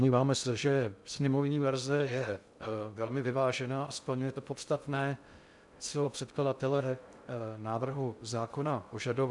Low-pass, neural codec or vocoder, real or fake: 10.8 kHz; codec, 16 kHz in and 24 kHz out, 0.6 kbps, FocalCodec, streaming, 2048 codes; fake